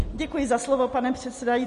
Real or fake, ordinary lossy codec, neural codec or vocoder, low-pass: real; MP3, 48 kbps; none; 10.8 kHz